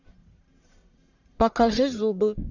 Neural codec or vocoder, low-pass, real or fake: codec, 44.1 kHz, 1.7 kbps, Pupu-Codec; 7.2 kHz; fake